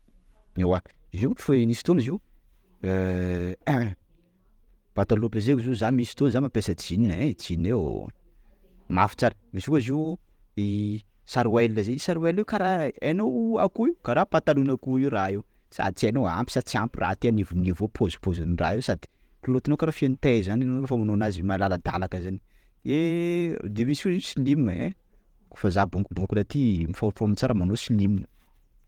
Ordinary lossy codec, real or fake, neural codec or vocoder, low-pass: Opus, 24 kbps; fake; vocoder, 44.1 kHz, 128 mel bands every 512 samples, BigVGAN v2; 19.8 kHz